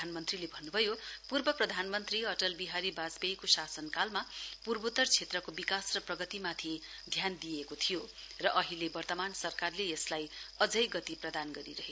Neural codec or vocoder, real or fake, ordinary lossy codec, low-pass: none; real; none; none